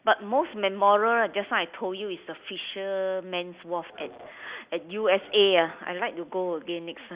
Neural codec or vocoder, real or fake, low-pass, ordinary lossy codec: none; real; 3.6 kHz; Opus, 64 kbps